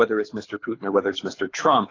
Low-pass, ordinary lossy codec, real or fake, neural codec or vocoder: 7.2 kHz; AAC, 32 kbps; fake; codec, 24 kHz, 6 kbps, HILCodec